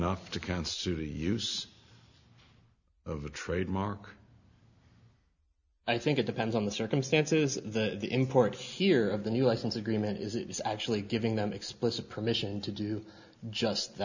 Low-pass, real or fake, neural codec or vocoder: 7.2 kHz; real; none